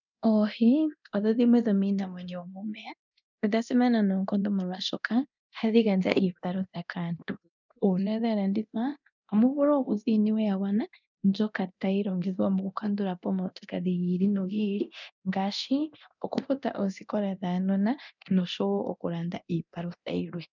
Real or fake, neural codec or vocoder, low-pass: fake; codec, 24 kHz, 0.9 kbps, DualCodec; 7.2 kHz